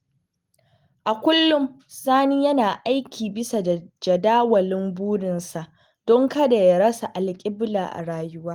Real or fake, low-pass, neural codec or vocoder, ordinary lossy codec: real; 19.8 kHz; none; Opus, 24 kbps